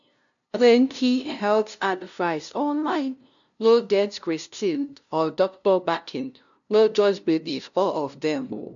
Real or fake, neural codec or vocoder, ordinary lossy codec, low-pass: fake; codec, 16 kHz, 0.5 kbps, FunCodec, trained on LibriTTS, 25 frames a second; MP3, 96 kbps; 7.2 kHz